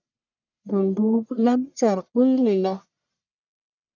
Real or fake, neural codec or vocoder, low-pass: fake; codec, 44.1 kHz, 1.7 kbps, Pupu-Codec; 7.2 kHz